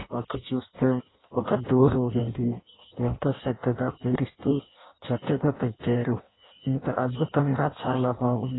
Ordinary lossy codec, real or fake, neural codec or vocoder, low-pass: AAC, 16 kbps; fake; codec, 16 kHz in and 24 kHz out, 0.6 kbps, FireRedTTS-2 codec; 7.2 kHz